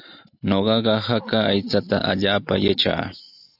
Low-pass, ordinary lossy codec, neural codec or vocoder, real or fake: 5.4 kHz; AAC, 48 kbps; none; real